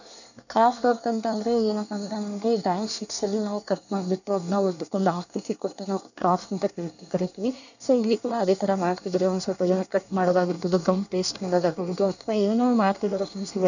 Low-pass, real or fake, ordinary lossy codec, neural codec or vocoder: 7.2 kHz; fake; none; codec, 24 kHz, 1 kbps, SNAC